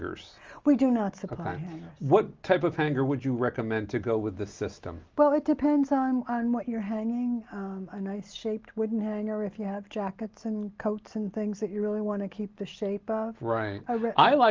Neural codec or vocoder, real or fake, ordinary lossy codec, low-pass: none; real; Opus, 32 kbps; 7.2 kHz